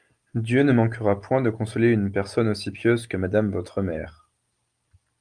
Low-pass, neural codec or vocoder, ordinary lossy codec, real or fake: 9.9 kHz; none; Opus, 32 kbps; real